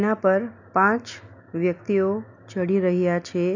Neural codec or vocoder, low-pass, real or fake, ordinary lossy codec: none; 7.2 kHz; real; none